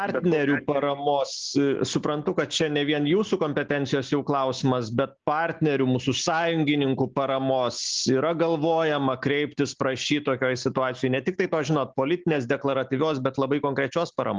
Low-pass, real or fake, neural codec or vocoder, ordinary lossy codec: 7.2 kHz; real; none; Opus, 24 kbps